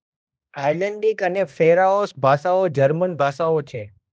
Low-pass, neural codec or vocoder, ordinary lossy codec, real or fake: none; codec, 16 kHz, 1 kbps, X-Codec, HuBERT features, trained on balanced general audio; none; fake